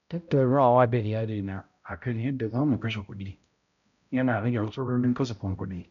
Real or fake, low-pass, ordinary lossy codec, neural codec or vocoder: fake; 7.2 kHz; none; codec, 16 kHz, 0.5 kbps, X-Codec, HuBERT features, trained on balanced general audio